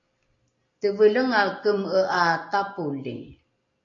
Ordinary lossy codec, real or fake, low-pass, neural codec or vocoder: AAC, 48 kbps; real; 7.2 kHz; none